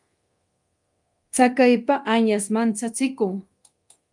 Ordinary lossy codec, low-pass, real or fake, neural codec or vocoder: Opus, 32 kbps; 10.8 kHz; fake; codec, 24 kHz, 0.9 kbps, DualCodec